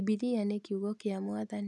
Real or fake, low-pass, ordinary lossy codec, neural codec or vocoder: real; none; none; none